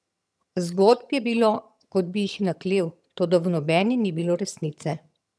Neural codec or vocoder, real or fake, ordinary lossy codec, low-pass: vocoder, 22.05 kHz, 80 mel bands, HiFi-GAN; fake; none; none